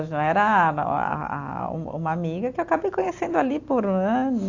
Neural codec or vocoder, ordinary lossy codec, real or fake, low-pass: none; AAC, 48 kbps; real; 7.2 kHz